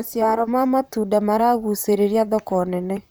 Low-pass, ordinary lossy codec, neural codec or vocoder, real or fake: none; none; vocoder, 44.1 kHz, 128 mel bands, Pupu-Vocoder; fake